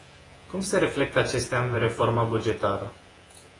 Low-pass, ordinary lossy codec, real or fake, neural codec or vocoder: 10.8 kHz; AAC, 32 kbps; fake; vocoder, 48 kHz, 128 mel bands, Vocos